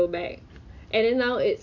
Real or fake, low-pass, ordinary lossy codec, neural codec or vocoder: real; 7.2 kHz; none; none